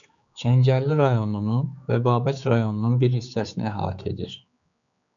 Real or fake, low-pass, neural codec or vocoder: fake; 7.2 kHz; codec, 16 kHz, 4 kbps, X-Codec, HuBERT features, trained on general audio